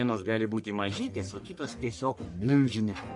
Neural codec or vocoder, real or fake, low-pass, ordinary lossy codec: codec, 44.1 kHz, 1.7 kbps, Pupu-Codec; fake; 10.8 kHz; MP3, 64 kbps